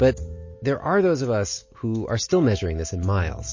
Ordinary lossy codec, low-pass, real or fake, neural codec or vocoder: MP3, 32 kbps; 7.2 kHz; real; none